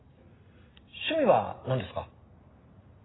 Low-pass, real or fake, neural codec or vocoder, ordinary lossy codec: 7.2 kHz; real; none; AAC, 16 kbps